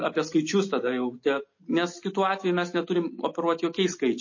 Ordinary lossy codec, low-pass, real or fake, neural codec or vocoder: MP3, 32 kbps; 7.2 kHz; real; none